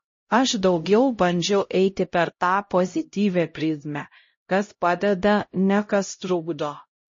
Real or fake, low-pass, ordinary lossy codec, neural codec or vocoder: fake; 7.2 kHz; MP3, 32 kbps; codec, 16 kHz, 0.5 kbps, X-Codec, HuBERT features, trained on LibriSpeech